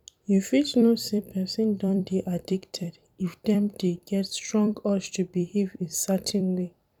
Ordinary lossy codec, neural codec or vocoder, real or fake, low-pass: none; vocoder, 44.1 kHz, 128 mel bands every 256 samples, BigVGAN v2; fake; 19.8 kHz